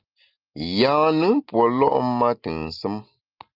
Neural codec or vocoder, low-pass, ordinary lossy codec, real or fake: none; 5.4 kHz; Opus, 24 kbps; real